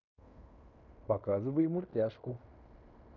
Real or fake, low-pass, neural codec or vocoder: fake; 7.2 kHz; codec, 16 kHz in and 24 kHz out, 0.9 kbps, LongCat-Audio-Codec, fine tuned four codebook decoder